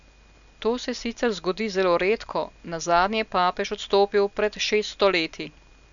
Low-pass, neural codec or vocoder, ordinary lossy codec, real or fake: 7.2 kHz; none; none; real